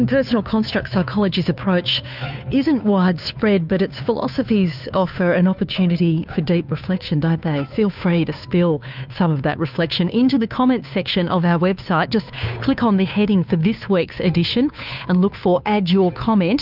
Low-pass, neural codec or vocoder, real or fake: 5.4 kHz; codec, 16 kHz, 2 kbps, FunCodec, trained on Chinese and English, 25 frames a second; fake